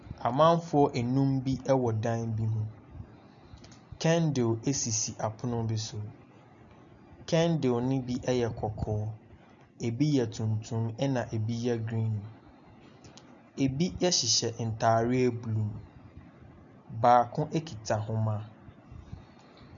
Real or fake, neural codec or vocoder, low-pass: real; none; 7.2 kHz